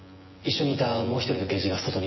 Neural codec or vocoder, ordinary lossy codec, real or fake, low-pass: vocoder, 24 kHz, 100 mel bands, Vocos; MP3, 24 kbps; fake; 7.2 kHz